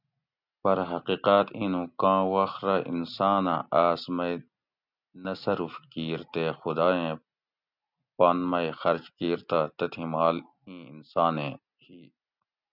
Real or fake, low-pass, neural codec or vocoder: real; 5.4 kHz; none